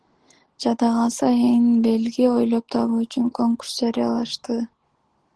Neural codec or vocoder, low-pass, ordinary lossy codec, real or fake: none; 10.8 kHz; Opus, 16 kbps; real